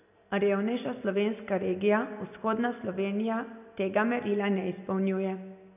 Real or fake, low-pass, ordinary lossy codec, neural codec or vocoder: real; 3.6 kHz; none; none